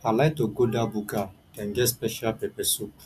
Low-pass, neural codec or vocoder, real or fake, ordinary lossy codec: 14.4 kHz; none; real; none